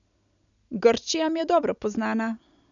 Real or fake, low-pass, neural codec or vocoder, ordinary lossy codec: real; 7.2 kHz; none; none